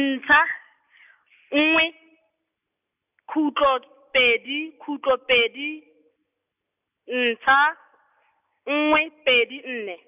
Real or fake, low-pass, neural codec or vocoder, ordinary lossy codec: real; 3.6 kHz; none; none